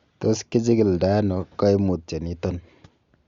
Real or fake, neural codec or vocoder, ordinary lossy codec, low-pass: real; none; Opus, 64 kbps; 7.2 kHz